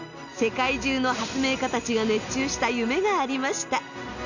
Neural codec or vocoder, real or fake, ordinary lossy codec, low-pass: none; real; none; 7.2 kHz